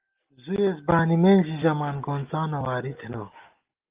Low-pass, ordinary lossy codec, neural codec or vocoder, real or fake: 3.6 kHz; Opus, 32 kbps; none; real